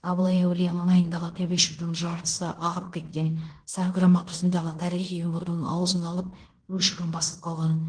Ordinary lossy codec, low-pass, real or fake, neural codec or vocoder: Opus, 16 kbps; 9.9 kHz; fake; codec, 16 kHz in and 24 kHz out, 0.9 kbps, LongCat-Audio-Codec, fine tuned four codebook decoder